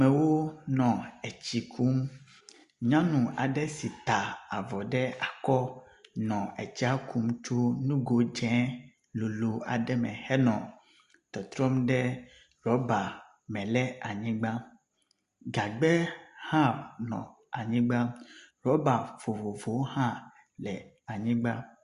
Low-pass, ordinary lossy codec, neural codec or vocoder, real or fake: 10.8 kHz; Opus, 64 kbps; none; real